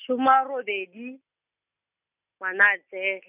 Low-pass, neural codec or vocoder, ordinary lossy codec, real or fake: 3.6 kHz; none; none; real